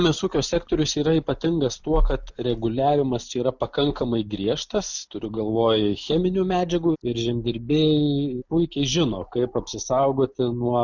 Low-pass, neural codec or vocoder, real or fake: 7.2 kHz; none; real